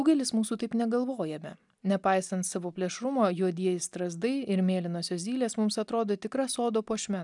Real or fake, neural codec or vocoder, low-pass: real; none; 10.8 kHz